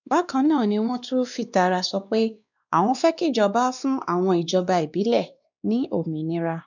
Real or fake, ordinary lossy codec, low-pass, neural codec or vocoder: fake; none; 7.2 kHz; codec, 16 kHz, 2 kbps, X-Codec, WavLM features, trained on Multilingual LibriSpeech